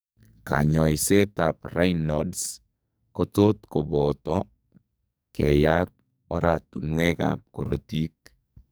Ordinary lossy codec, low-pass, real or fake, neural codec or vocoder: none; none; fake; codec, 44.1 kHz, 2.6 kbps, SNAC